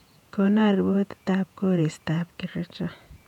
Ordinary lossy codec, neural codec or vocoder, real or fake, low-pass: none; vocoder, 48 kHz, 128 mel bands, Vocos; fake; 19.8 kHz